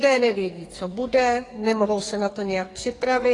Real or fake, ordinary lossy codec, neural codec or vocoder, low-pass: fake; AAC, 32 kbps; codec, 44.1 kHz, 2.6 kbps, SNAC; 10.8 kHz